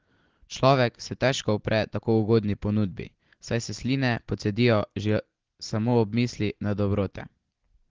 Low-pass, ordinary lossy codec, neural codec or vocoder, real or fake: 7.2 kHz; Opus, 16 kbps; none; real